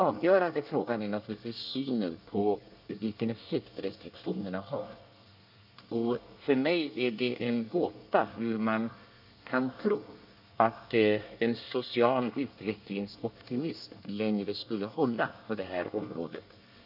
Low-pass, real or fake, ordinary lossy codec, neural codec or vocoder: 5.4 kHz; fake; none; codec, 24 kHz, 1 kbps, SNAC